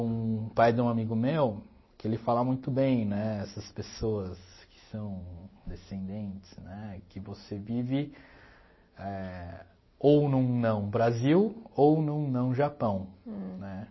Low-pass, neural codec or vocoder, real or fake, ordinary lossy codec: 7.2 kHz; none; real; MP3, 24 kbps